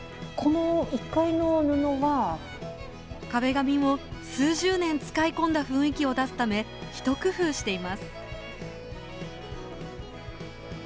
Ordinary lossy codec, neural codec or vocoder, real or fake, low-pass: none; none; real; none